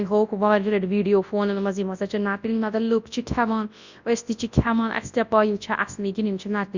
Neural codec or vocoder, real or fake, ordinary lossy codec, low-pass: codec, 24 kHz, 0.9 kbps, WavTokenizer, large speech release; fake; Opus, 64 kbps; 7.2 kHz